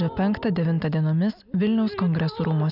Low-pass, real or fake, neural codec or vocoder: 5.4 kHz; real; none